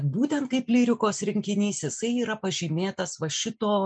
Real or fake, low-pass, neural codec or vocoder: real; 9.9 kHz; none